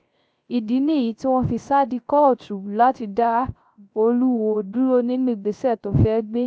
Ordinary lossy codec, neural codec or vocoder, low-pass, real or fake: none; codec, 16 kHz, 0.3 kbps, FocalCodec; none; fake